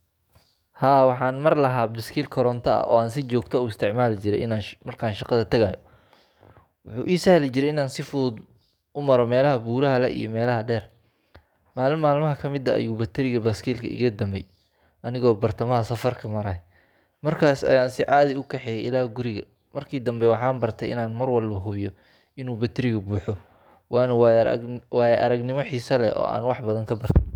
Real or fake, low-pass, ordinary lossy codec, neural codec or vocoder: fake; 19.8 kHz; none; codec, 44.1 kHz, 7.8 kbps, DAC